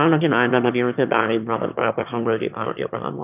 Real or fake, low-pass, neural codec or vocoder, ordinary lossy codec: fake; 3.6 kHz; autoencoder, 22.05 kHz, a latent of 192 numbers a frame, VITS, trained on one speaker; none